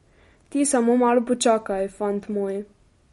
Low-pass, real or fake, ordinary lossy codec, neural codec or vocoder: 19.8 kHz; real; MP3, 48 kbps; none